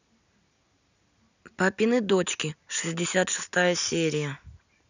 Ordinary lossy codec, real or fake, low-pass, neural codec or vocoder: none; real; 7.2 kHz; none